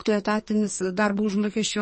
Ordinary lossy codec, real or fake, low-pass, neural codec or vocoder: MP3, 32 kbps; fake; 9.9 kHz; codec, 44.1 kHz, 2.6 kbps, SNAC